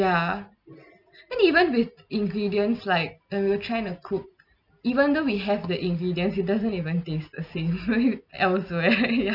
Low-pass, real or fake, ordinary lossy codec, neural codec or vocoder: 5.4 kHz; real; none; none